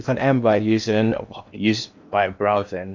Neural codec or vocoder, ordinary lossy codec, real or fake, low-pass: codec, 16 kHz in and 24 kHz out, 0.8 kbps, FocalCodec, streaming, 65536 codes; MP3, 64 kbps; fake; 7.2 kHz